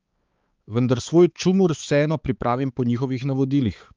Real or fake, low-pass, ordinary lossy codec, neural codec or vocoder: fake; 7.2 kHz; Opus, 32 kbps; codec, 16 kHz, 4 kbps, X-Codec, HuBERT features, trained on balanced general audio